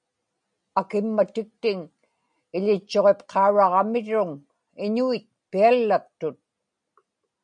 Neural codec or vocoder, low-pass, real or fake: none; 9.9 kHz; real